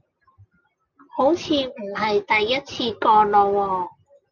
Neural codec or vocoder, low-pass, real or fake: none; 7.2 kHz; real